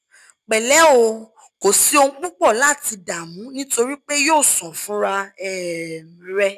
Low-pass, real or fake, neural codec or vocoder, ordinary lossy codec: 14.4 kHz; real; none; none